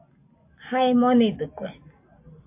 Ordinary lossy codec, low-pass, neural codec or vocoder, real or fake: MP3, 32 kbps; 3.6 kHz; none; real